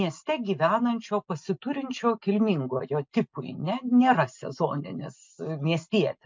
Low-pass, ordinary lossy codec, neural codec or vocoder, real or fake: 7.2 kHz; MP3, 64 kbps; none; real